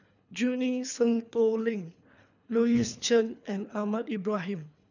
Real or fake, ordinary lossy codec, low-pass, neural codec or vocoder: fake; none; 7.2 kHz; codec, 24 kHz, 3 kbps, HILCodec